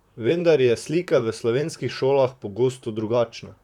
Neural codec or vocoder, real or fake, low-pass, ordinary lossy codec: vocoder, 44.1 kHz, 128 mel bands, Pupu-Vocoder; fake; 19.8 kHz; none